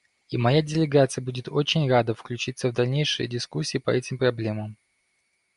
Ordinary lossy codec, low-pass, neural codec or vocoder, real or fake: MP3, 96 kbps; 10.8 kHz; none; real